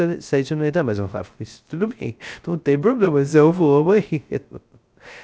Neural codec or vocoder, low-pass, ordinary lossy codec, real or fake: codec, 16 kHz, 0.3 kbps, FocalCodec; none; none; fake